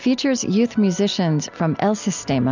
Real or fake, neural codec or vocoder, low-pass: real; none; 7.2 kHz